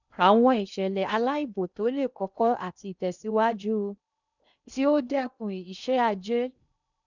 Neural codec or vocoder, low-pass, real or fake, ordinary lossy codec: codec, 16 kHz in and 24 kHz out, 0.8 kbps, FocalCodec, streaming, 65536 codes; 7.2 kHz; fake; none